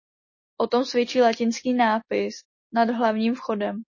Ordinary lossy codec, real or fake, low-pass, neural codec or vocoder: MP3, 32 kbps; real; 7.2 kHz; none